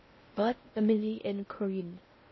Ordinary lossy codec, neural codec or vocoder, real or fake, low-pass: MP3, 24 kbps; codec, 16 kHz in and 24 kHz out, 0.6 kbps, FocalCodec, streaming, 4096 codes; fake; 7.2 kHz